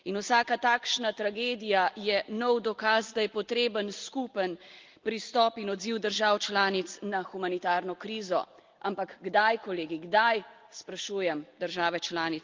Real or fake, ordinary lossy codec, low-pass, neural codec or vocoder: real; Opus, 32 kbps; 7.2 kHz; none